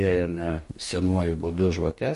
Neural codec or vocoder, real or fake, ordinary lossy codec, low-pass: codec, 44.1 kHz, 2.6 kbps, DAC; fake; MP3, 48 kbps; 14.4 kHz